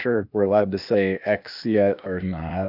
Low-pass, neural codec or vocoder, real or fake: 5.4 kHz; codec, 16 kHz, 0.8 kbps, ZipCodec; fake